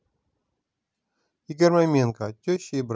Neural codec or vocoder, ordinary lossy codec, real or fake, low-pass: none; none; real; none